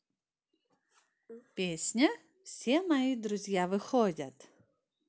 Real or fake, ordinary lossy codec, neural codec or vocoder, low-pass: real; none; none; none